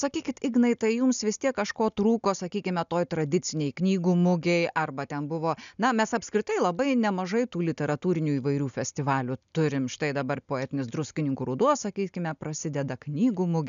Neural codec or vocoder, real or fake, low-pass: none; real; 7.2 kHz